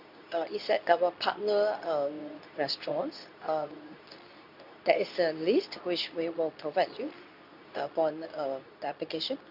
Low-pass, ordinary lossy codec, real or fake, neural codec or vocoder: 5.4 kHz; none; fake; codec, 24 kHz, 0.9 kbps, WavTokenizer, medium speech release version 2